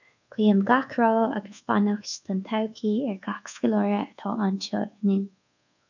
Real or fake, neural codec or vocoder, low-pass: fake; codec, 24 kHz, 1.2 kbps, DualCodec; 7.2 kHz